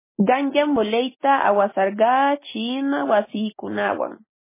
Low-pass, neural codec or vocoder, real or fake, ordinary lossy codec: 3.6 kHz; none; real; MP3, 16 kbps